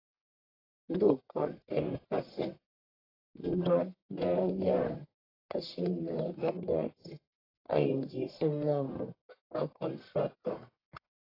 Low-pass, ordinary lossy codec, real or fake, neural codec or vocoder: 5.4 kHz; AAC, 24 kbps; fake; codec, 44.1 kHz, 1.7 kbps, Pupu-Codec